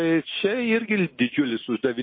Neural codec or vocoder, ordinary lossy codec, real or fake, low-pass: none; MP3, 24 kbps; real; 5.4 kHz